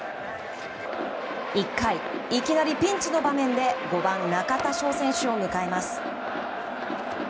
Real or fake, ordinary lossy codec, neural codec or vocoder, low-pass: real; none; none; none